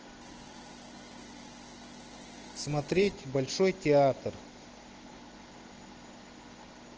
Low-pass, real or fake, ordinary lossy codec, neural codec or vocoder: 7.2 kHz; real; Opus, 16 kbps; none